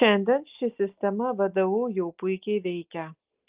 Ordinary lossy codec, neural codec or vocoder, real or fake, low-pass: Opus, 32 kbps; none; real; 3.6 kHz